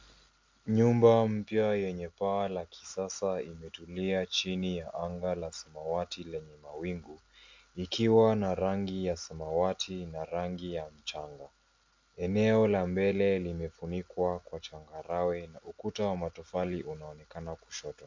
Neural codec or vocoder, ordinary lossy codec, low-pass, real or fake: none; MP3, 64 kbps; 7.2 kHz; real